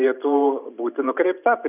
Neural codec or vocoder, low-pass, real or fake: vocoder, 44.1 kHz, 128 mel bands every 512 samples, BigVGAN v2; 3.6 kHz; fake